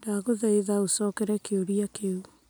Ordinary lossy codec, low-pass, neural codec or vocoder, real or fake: none; none; none; real